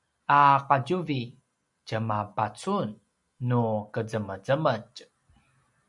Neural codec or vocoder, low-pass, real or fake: none; 10.8 kHz; real